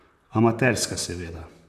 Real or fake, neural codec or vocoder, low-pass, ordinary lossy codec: fake; vocoder, 44.1 kHz, 128 mel bands, Pupu-Vocoder; 14.4 kHz; none